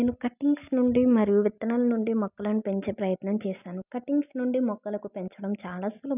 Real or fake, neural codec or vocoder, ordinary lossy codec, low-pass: real; none; none; 3.6 kHz